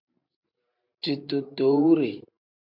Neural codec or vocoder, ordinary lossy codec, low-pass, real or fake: vocoder, 44.1 kHz, 128 mel bands every 512 samples, BigVGAN v2; AAC, 48 kbps; 5.4 kHz; fake